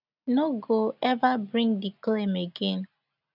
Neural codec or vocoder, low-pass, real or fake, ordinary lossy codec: none; 5.4 kHz; real; none